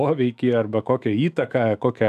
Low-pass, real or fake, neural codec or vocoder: 14.4 kHz; fake; autoencoder, 48 kHz, 128 numbers a frame, DAC-VAE, trained on Japanese speech